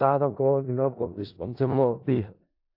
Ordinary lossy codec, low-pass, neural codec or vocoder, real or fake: AAC, 48 kbps; 5.4 kHz; codec, 16 kHz in and 24 kHz out, 0.4 kbps, LongCat-Audio-Codec, four codebook decoder; fake